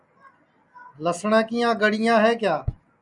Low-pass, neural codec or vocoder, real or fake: 10.8 kHz; none; real